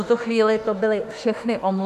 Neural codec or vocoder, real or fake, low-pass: autoencoder, 48 kHz, 32 numbers a frame, DAC-VAE, trained on Japanese speech; fake; 14.4 kHz